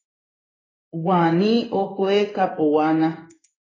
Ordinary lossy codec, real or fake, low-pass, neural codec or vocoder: AAC, 32 kbps; fake; 7.2 kHz; codec, 16 kHz in and 24 kHz out, 1 kbps, XY-Tokenizer